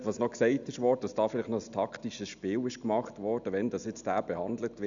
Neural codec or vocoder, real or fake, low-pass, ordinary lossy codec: none; real; 7.2 kHz; none